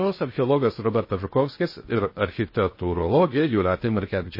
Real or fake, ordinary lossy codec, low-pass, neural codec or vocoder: fake; MP3, 24 kbps; 5.4 kHz; codec, 16 kHz in and 24 kHz out, 0.6 kbps, FocalCodec, streaming, 2048 codes